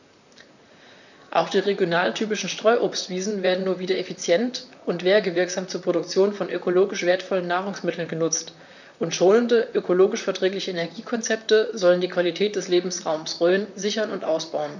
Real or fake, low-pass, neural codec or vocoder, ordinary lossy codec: fake; 7.2 kHz; vocoder, 44.1 kHz, 128 mel bands, Pupu-Vocoder; none